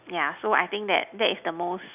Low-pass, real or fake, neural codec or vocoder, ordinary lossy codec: 3.6 kHz; real; none; none